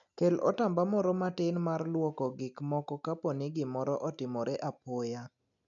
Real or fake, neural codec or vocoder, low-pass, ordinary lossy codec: real; none; 7.2 kHz; none